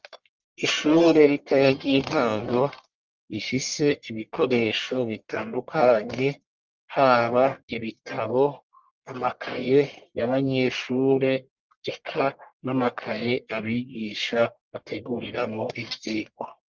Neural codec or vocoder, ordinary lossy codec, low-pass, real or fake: codec, 44.1 kHz, 1.7 kbps, Pupu-Codec; Opus, 32 kbps; 7.2 kHz; fake